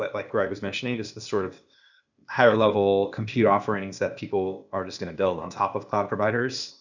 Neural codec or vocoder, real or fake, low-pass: codec, 16 kHz, 0.8 kbps, ZipCodec; fake; 7.2 kHz